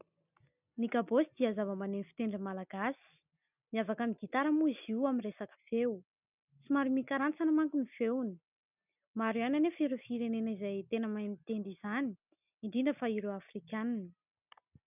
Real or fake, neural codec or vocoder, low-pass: real; none; 3.6 kHz